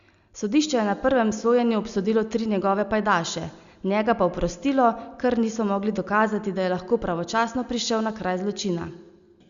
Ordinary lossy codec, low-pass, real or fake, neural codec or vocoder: Opus, 64 kbps; 7.2 kHz; real; none